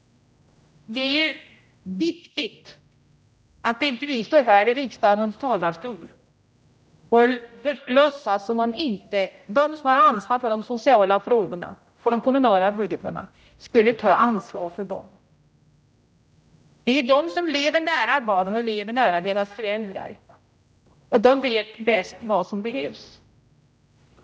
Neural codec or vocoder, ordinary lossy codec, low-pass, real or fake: codec, 16 kHz, 0.5 kbps, X-Codec, HuBERT features, trained on general audio; none; none; fake